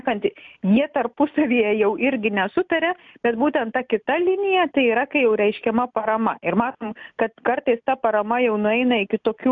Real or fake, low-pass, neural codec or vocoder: real; 7.2 kHz; none